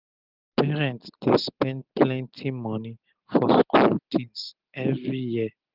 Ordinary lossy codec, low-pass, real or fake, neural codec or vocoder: Opus, 32 kbps; 5.4 kHz; real; none